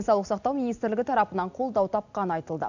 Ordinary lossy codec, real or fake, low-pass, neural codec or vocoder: none; real; 7.2 kHz; none